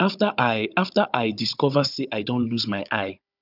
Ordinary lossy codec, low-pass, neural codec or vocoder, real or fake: none; 5.4 kHz; codec, 16 kHz, 16 kbps, FunCodec, trained on Chinese and English, 50 frames a second; fake